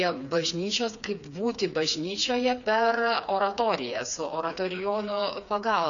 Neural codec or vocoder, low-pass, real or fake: codec, 16 kHz, 4 kbps, FreqCodec, smaller model; 7.2 kHz; fake